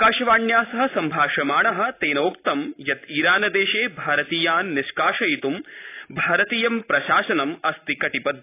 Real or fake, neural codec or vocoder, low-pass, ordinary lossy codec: real; none; 3.6 kHz; AAC, 24 kbps